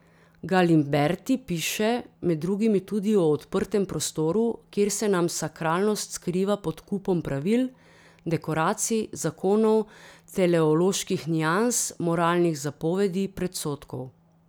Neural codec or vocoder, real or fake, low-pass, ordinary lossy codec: none; real; none; none